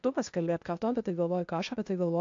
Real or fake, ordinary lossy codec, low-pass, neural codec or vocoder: fake; AAC, 48 kbps; 7.2 kHz; codec, 16 kHz, 0.8 kbps, ZipCodec